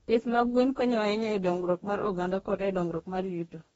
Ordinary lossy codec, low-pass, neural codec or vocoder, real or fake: AAC, 24 kbps; 19.8 kHz; codec, 44.1 kHz, 2.6 kbps, DAC; fake